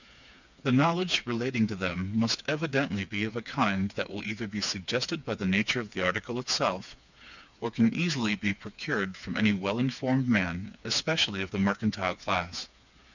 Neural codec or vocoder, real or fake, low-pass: codec, 16 kHz, 4 kbps, FreqCodec, smaller model; fake; 7.2 kHz